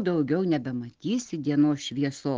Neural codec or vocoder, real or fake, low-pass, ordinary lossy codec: none; real; 7.2 kHz; Opus, 16 kbps